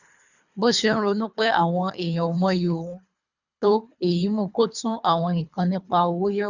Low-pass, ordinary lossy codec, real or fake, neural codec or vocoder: 7.2 kHz; none; fake; codec, 24 kHz, 3 kbps, HILCodec